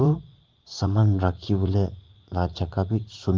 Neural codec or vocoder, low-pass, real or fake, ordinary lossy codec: vocoder, 44.1 kHz, 80 mel bands, Vocos; 7.2 kHz; fake; Opus, 24 kbps